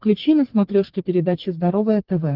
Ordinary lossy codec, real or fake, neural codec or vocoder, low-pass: Opus, 16 kbps; fake; codec, 44.1 kHz, 3.4 kbps, Pupu-Codec; 5.4 kHz